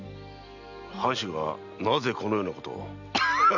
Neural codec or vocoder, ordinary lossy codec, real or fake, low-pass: none; none; real; 7.2 kHz